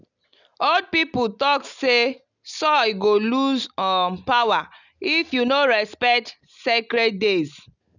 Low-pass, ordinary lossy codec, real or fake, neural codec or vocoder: 7.2 kHz; none; real; none